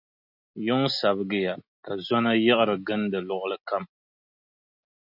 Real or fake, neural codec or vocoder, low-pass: real; none; 5.4 kHz